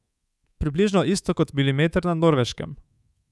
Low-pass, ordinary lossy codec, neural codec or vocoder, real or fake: none; none; codec, 24 kHz, 3.1 kbps, DualCodec; fake